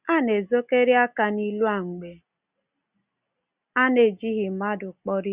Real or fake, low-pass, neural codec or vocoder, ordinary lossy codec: real; 3.6 kHz; none; Opus, 64 kbps